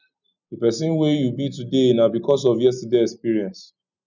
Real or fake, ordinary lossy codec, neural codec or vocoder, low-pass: real; none; none; 7.2 kHz